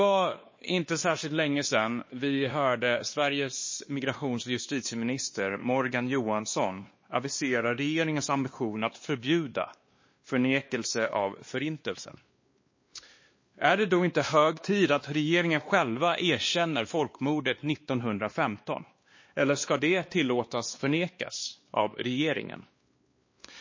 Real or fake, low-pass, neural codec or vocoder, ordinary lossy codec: fake; 7.2 kHz; codec, 16 kHz, 2 kbps, X-Codec, WavLM features, trained on Multilingual LibriSpeech; MP3, 32 kbps